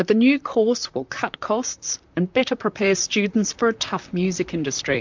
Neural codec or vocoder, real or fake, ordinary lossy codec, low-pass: vocoder, 44.1 kHz, 128 mel bands, Pupu-Vocoder; fake; MP3, 64 kbps; 7.2 kHz